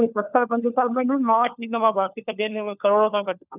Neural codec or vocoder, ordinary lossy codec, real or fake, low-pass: codec, 16 kHz, 4 kbps, FunCodec, trained on LibriTTS, 50 frames a second; none; fake; 3.6 kHz